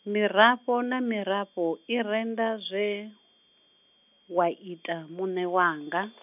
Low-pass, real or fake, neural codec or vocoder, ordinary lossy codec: 3.6 kHz; real; none; none